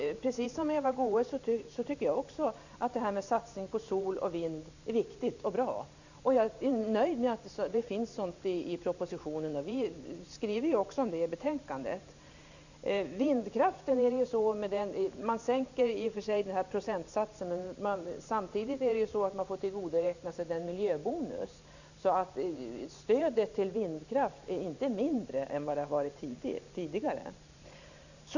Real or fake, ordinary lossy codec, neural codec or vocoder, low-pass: fake; none; vocoder, 44.1 kHz, 128 mel bands every 512 samples, BigVGAN v2; 7.2 kHz